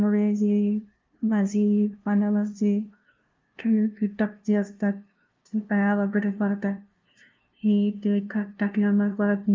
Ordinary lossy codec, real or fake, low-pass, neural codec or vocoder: Opus, 24 kbps; fake; 7.2 kHz; codec, 16 kHz, 0.5 kbps, FunCodec, trained on LibriTTS, 25 frames a second